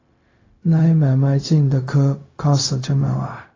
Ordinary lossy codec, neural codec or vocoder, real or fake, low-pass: AAC, 32 kbps; codec, 16 kHz, 0.4 kbps, LongCat-Audio-Codec; fake; 7.2 kHz